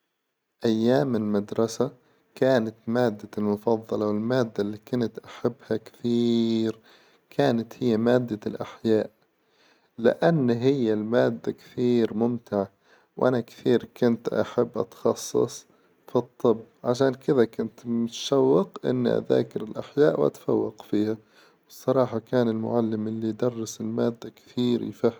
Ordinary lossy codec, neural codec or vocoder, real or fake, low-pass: none; none; real; none